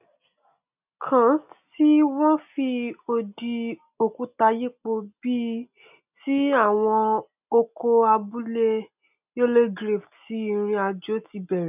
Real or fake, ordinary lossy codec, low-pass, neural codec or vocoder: real; none; 3.6 kHz; none